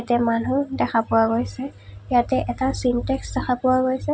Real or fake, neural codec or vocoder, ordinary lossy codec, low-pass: real; none; none; none